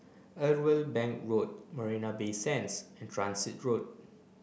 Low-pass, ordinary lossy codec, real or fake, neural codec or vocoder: none; none; real; none